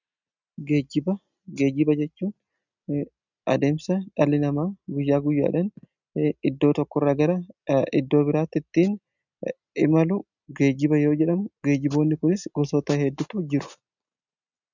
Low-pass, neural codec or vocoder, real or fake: 7.2 kHz; none; real